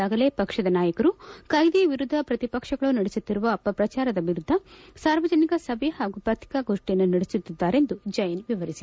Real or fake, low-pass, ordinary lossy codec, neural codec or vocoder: real; none; none; none